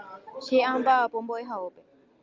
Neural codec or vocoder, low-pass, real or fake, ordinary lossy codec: none; 7.2 kHz; real; Opus, 32 kbps